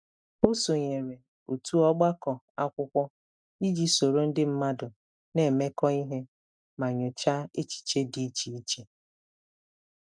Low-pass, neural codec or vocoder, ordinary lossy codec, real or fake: 9.9 kHz; none; none; real